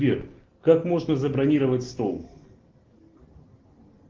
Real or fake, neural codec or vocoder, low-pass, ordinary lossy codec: real; none; 7.2 kHz; Opus, 16 kbps